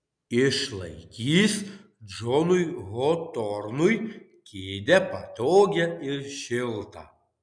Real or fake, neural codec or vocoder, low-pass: real; none; 9.9 kHz